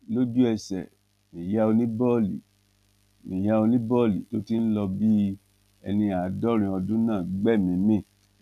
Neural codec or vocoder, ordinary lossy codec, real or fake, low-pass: none; none; real; 14.4 kHz